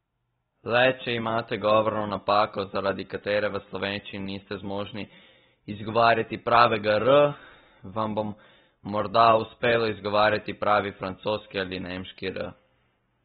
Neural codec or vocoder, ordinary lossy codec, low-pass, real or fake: none; AAC, 16 kbps; 7.2 kHz; real